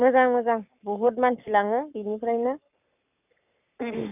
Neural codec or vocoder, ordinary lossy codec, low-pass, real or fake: codec, 44.1 kHz, 7.8 kbps, Pupu-Codec; none; 3.6 kHz; fake